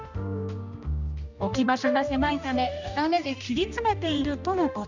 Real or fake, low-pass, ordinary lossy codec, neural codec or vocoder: fake; 7.2 kHz; none; codec, 16 kHz, 1 kbps, X-Codec, HuBERT features, trained on general audio